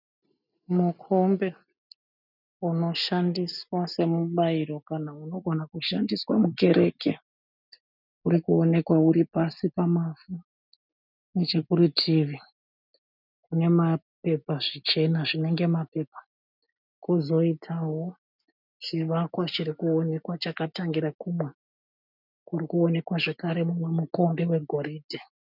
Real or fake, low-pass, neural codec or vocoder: real; 5.4 kHz; none